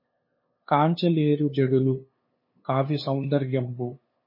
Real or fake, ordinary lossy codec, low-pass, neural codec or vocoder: fake; MP3, 24 kbps; 5.4 kHz; codec, 16 kHz, 2 kbps, FunCodec, trained on LibriTTS, 25 frames a second